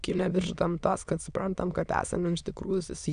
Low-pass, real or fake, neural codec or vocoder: 9.9 kHz; fake; autoencoder, 22.05 kHz, a latent of 192 numbers a frame, VITS, trained on many speakers